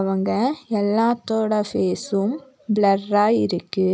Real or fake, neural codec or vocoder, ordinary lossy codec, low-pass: real; none; none; none